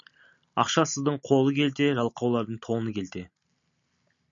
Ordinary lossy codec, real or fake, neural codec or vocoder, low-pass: MP3, 64 kbps; real; none; 7.2 kHz